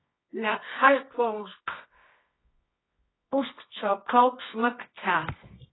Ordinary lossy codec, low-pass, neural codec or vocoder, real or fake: AAC, 16 kbps; 7.2 kHz; codec, 24 kHz, 0.9 kbps, WavTokenizer, medium music audio release; fake